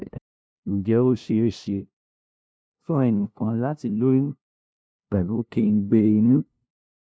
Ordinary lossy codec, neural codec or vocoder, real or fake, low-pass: none; codec, 16 kHz, 0.5 kbps, FunCodec, trained on LibriTTS, 25 frames a second; fake; none